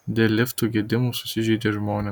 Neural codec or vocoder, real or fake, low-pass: none; real; 19.8 kHz